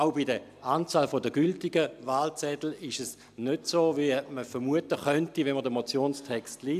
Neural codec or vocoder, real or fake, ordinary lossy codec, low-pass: none; real; AAC, 96 kbps; 14.4 kHz